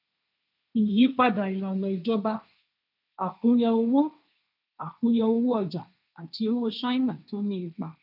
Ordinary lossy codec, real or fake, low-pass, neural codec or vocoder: none; fake; 5.4 kHz; codec, 16 kHz, 1.1 kbps, Voila-Tokenizer